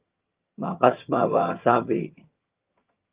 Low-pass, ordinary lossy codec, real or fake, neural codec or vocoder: 3.6 kHz; Opus, 32 kbps; fake; vocoder, 22.05 kHz, 80 mel bands, HiFi-GAN